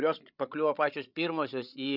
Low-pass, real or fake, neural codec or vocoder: 5.4 kHz; fake; codec, 16 kHz, 16 kbps, FreqCodec, larger model